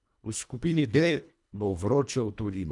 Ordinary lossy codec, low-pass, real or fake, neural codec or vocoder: none; 10.8 kHz; fake; codec, 24 kHz, 1.5 kbps, HILCodec